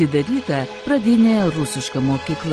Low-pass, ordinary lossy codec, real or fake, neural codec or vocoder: 9.9 kHz; Opus, 16 kbps; real; none